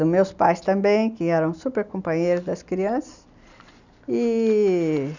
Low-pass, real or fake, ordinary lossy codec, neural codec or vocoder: 7.2 kHz; real; none; none